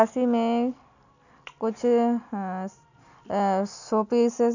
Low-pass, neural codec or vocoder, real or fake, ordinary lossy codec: 7.2 kHz; none; real; none